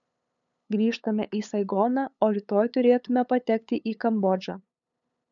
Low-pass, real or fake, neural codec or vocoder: 7.2 kHz; fake; codec, 16 kHz, 8 kbps, FunCodec, trained on LibriTTS, 25 frames a second